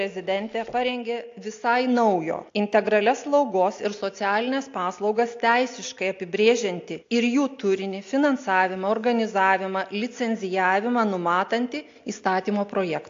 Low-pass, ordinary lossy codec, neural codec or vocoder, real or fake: 7.2 kHz; AAC, 48 kbps; none; real